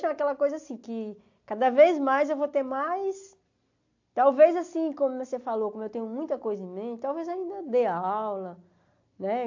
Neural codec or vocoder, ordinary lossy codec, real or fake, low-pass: none; AAC, 48 kbps; real; 7.2 kHz